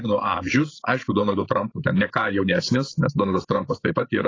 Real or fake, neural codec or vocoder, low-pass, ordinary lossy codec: fake; codec, 16 kHz, 8 kbps, FreqCodec, larger model; 7.2 kHz; AAC, 32 kbps